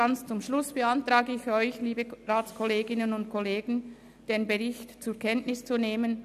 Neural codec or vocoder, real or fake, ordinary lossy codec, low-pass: none; real; none; 14.4 kHz